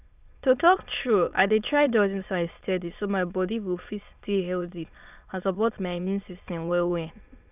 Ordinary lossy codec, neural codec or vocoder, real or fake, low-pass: none; autoencoder, 22.05 kHz, a latent of 192 numbers a frame, VITS, trained on many speakers; fake; 3.6 kHz